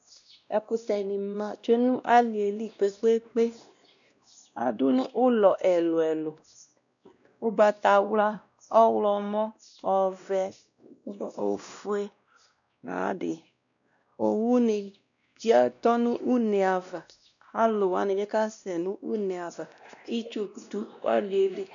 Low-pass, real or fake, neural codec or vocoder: 7.2 kHz; fake; codec, 16 kHz, 1 kbps, X-Codec, WavLM features, trained on Multilingual LibriSpeech